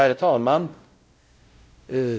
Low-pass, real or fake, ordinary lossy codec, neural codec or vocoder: none; fake; none; codec, 16 kHz, 0.5 kbps, X-Codec, WavLM features, trained on Multilingual LibriSpeech